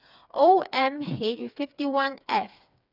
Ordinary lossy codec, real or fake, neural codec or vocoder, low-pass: none; fake; codec, 16 kHz, 4 kbps, FreqCodec, smaller model; 5.4 kHz